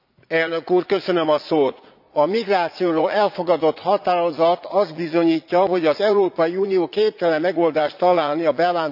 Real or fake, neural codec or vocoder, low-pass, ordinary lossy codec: fake; vocoder, 44.1 kHz, 80 mel bands, Vocos; 5.4 kHz; AAC, 48 kbps